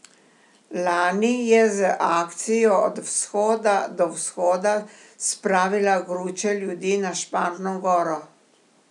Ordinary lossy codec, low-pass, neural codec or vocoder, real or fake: none; 10.8 kHz; none; real